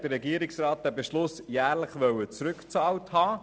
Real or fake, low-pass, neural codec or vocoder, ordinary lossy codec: real; none; none; none